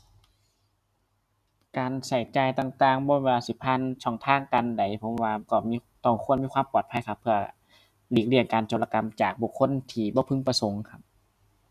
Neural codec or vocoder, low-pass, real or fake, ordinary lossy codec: none; 14.4 kHz; real; none